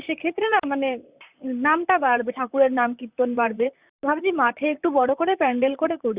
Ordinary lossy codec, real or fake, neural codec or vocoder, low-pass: Opus, 64 kbps; fake; vocoder, 44.1 kHz, 128 mel bands, Pupu-Vocoder; 3.6 kHz